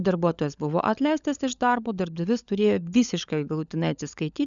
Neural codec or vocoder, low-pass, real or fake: codec, 16 kHz, 16 kbps, FunCodec, trained on LibriTTS, 50 frames a second; 7.2 kHz; fake